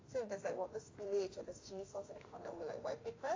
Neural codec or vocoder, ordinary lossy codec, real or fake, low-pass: autoencoder, 48 kHz, 32 numbers a frame, DAC-VAE, trained on Japanese speech; AAC, 32 kbps; fake; 7.2 kHz